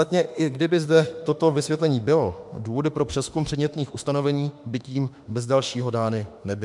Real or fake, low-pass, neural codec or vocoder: fake; 10.8 kHz; autoencoder, 48 kHz, 32 numbers a frame, DAC-VAE, trained on Japanese speech